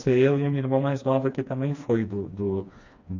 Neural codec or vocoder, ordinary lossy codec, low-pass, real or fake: codec, 16 kHz, 2 kbps, FreqCodec, smaller model; AAC, 48 kbps; 7.2 kHz; fake